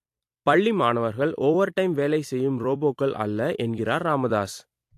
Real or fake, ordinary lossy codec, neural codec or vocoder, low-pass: fake; AAC, 64 kbps; vocoder, 44.1 kHz, 128 mel bands every 256 samples, BigVGAN v2; 14.4 kHz